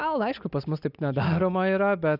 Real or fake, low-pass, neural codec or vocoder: real; 5.4 kHz; none